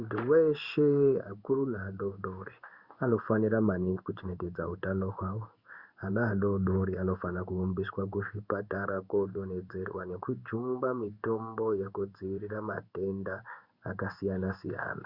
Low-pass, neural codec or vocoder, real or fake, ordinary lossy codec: 5.4 kHz; codec, 16 kHz in and 24 kHz out, 1 kbps, XY-Tokenizer; fake; Opus, 64 kbps